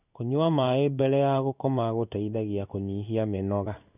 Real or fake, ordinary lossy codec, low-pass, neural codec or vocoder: fake; none; 3.6 kHz; codec, 16 kHz in and 24 kHz out, 1 kbps, XY-Tokenizer